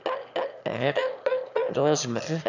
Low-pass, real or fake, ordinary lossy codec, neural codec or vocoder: 7.2 kHz; fake; none; autoencoder, 22.05 kHz, a latent of 192 numbers a frame, VITS, trained on one speaker